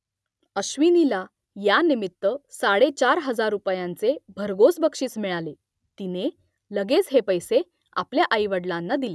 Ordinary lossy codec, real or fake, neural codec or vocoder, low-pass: none; real; none; none